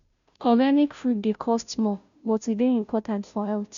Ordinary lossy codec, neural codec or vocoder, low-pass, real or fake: MP3, 96 kbps; codec, 16 kHz, 0.5 kbps, FunCodec, trained on Chinese and English, 25 frames a second; 7.2 kHz; fake